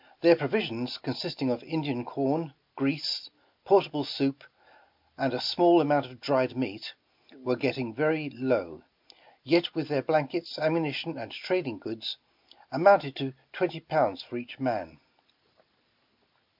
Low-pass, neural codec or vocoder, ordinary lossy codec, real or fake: 5.4 kHz; none; MP3, 48 kbps; real